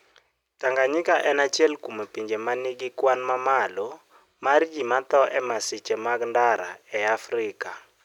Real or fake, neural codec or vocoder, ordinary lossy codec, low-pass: real; none; none; 19.8 kHz